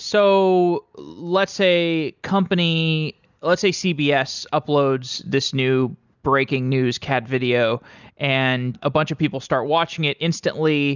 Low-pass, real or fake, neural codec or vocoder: 7.2 kHz; real; none